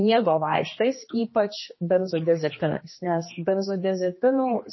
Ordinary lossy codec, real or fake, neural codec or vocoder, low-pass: MP3, 24 kbps; fake; codec, 16 kHz, 2 kbps, X-Codec, HuBERT features, trained on general audio; 7.2 kHz